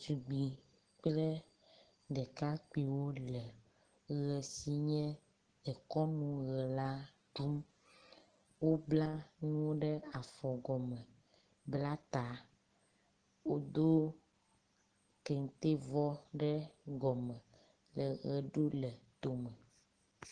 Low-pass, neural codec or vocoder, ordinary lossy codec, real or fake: 9.9 kHz; none; Opus, 16 kbps; real